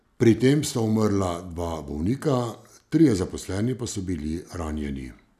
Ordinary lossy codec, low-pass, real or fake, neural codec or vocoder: none; 14.4 kHz; real; none